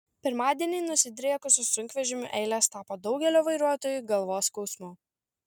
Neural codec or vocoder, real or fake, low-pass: none; real; 19.8 kHz